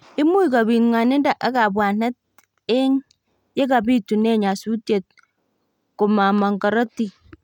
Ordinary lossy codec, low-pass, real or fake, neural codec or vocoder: none; 19.8 kHz; real; none